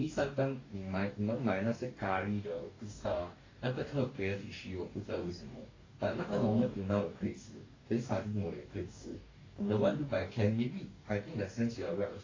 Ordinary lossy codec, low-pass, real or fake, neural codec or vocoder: AAC, 32 kbps; 7.2 kHz; fake; codec, 44.1 kHz, 2.6 kbps, DAC